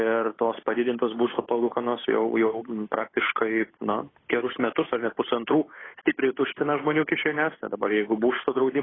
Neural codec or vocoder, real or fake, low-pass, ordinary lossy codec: codec, 16 kHz, 8 kbps, FunCodec, trained on Chinese and English, 25 frames a second; fake; 7.2 kHz; AAC, 16 kbps